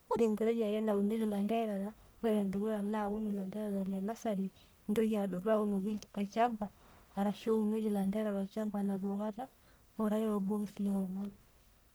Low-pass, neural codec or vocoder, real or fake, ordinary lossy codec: none; codec, 44.1 kHz, 1.7 kbps, Pupu-Codec; fake; none